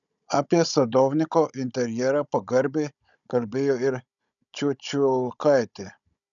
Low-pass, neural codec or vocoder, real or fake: 7.2 kHz; codec, 16 kHz, 16 kbps, FunCodec, trained on Chinese and English, 50 frames a second; fake